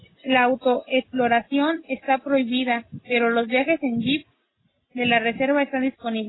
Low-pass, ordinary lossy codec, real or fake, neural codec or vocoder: 7.2 kHz; AAC, 16 kbps; real; none